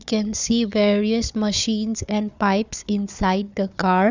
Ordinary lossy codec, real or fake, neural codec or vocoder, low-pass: none; fake; codec, 16 kHz, 4 kbps, FunCodec, trained on Chinese and English, 50 frames a second; 7.2 kHz